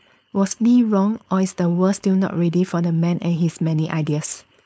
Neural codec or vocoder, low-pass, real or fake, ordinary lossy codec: codec, 16 kHz, 4.8 kbps, FACodec; none; fake; none